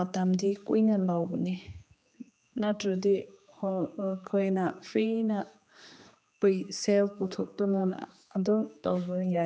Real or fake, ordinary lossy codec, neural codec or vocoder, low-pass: fake; none; codec, 16 kHz, 2 kbps, X-Codec, HuBERT features, trained on general audio; none